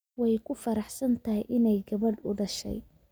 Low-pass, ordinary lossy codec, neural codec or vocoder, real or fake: none; none; none; real